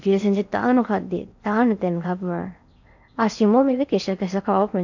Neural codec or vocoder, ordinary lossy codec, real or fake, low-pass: codec, 16 kHz in and 24 kHz out, 0.8 kbps, FocalCodec, streaming, 65536 codes; none; fake; 7.2 kHz